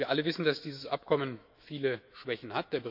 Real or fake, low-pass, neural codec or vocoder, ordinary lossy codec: fake; 5.4 kHz; autoencoder, 48 kHz, 128 numbers a frame, DAC-VAE, trained on Japanese speech; none